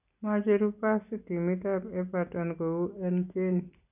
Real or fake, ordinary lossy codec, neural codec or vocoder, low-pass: real; none; none; 3.6 kHz